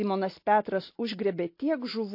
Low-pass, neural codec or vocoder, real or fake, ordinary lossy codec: 5.4 kHz; none; real; MP3, 32 kbps